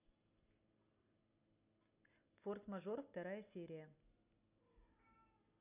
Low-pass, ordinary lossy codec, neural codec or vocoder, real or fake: 3.6 kHz; none; none; real